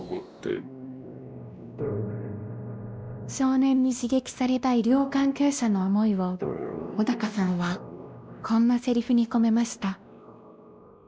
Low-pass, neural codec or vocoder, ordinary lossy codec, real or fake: none; codec, 16 kHz, 1 kbps, X-Codec, WavLM features, trained on Multilingual LibriSpeech; none; fake